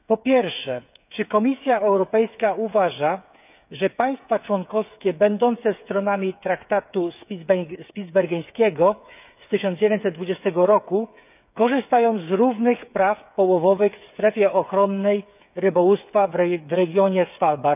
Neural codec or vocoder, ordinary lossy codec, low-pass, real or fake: codec, 16 kHz, 8 kbps, FreqCodec, smaller model; none; 3.6 kHz; fake